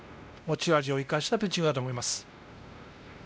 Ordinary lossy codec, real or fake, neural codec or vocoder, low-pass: none; fake; codec, 16 kHz, 1 kbps, X-Codec, WavLM features, trained on Multilingual LibriSpeech; none